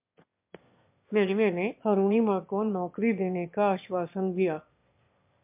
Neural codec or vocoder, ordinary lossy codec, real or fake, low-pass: autoencoder, 22.05 kHz, a latent of 192 numbers a frame, VITS, trained on one speaker; MP3, 32 kbps; fake; 3.6 kHz